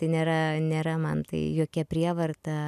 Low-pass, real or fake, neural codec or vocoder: 14.4 kHz; real; none